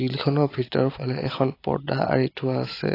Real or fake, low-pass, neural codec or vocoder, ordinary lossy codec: real; 5.4 kHz; none; AAC, 24 kbps